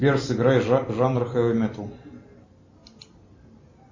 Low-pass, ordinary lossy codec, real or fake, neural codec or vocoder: 7.2 kHz; MP3, 32 kbps; real; none